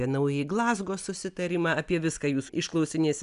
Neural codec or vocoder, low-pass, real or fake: none; 10.8 kHz; real